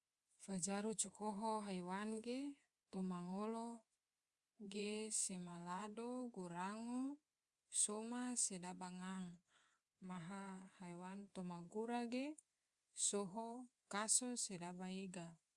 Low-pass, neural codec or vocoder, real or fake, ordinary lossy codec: 10.8 kHz; codec, 24 kHz, 3.1 kbps, DualCodec; fake; Opus, 64 kbps